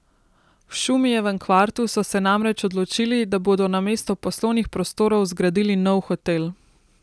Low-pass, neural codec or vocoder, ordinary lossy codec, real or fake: none; none; none; real